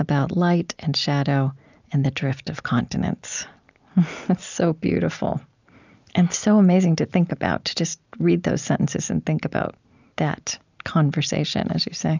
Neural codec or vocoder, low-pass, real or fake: none; 7.2 kHz; real